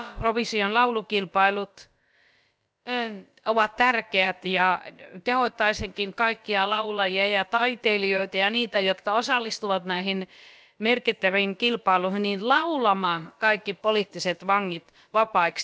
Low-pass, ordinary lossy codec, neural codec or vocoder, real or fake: none; none; codec, 16 kHz, about 1 kbps, DyCAST, with the encoder's durations; fake